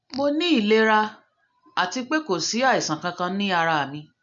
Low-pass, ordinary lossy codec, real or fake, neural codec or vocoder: 7.2 kHz; MP3, 64 kbps; real; none